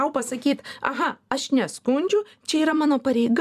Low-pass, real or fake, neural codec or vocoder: 14.4 kHz; fake; vocoder, 44.1 kHz, 128 mel bands every 256 samples, BigVGAN v2